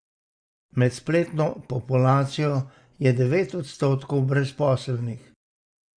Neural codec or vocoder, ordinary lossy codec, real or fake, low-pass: none; Opus, 64 kbps; real; 9.9 kHz